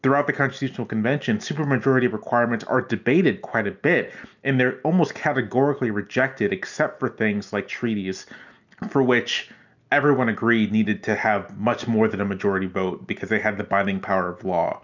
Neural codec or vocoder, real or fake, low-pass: none; real; 7.2 kHz